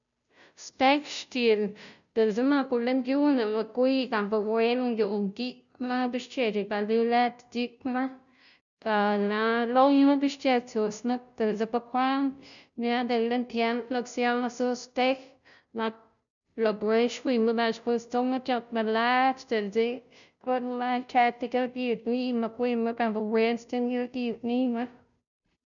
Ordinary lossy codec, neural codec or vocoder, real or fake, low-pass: none; codec, 16 kHz, 0.5 kbps, FunCodec, trained on Chinese and English, 25 frames a second; fake; 7.2 kHz